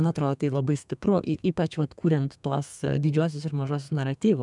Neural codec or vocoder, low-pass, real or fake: codec, 32 kHz, 1.9 kbps, SNAC; 10.8 kHz; fake